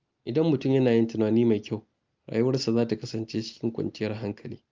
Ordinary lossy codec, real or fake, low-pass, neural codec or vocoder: Opus, 32 kbps; real; 7.2 kHz; none